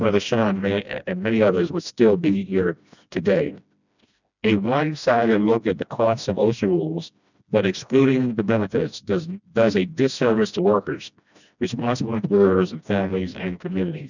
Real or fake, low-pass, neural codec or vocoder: fake; 7.2 kHz; codec, 16 kHz, 1 kbps, FreqCodec, smaller model